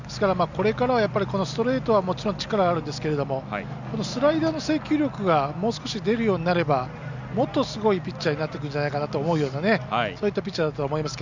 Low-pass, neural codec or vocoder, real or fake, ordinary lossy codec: 7.2 kHz; none; real; none